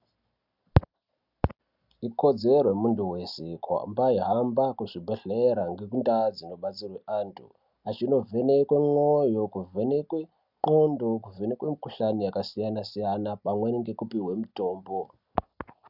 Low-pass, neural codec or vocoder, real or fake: 5.4 kHz; none; real